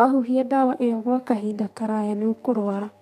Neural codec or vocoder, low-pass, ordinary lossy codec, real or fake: codec, 32 kHz, 1.9 kbps, SNAC; 14.4 kHz; MP3, 96 kbps; fake